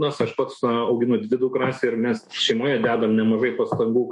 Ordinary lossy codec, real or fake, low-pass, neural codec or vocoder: MP3, 48 kbps; fake; 10.8 kHz; autoencoder, 48 kHz, 128 numbers a frame, DAC-VAE, trained on Japanese speech